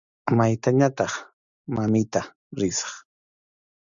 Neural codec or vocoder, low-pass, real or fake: none; 7.2 kHz; real